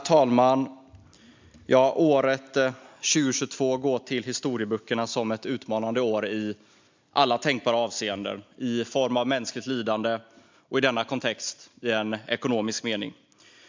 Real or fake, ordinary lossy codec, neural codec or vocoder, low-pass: real; MP3, 64 kbps; none; 7.2 kHz